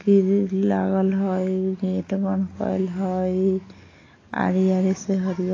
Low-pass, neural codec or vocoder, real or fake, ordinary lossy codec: 7.2 kHz; none; real; AAC, 32 kbps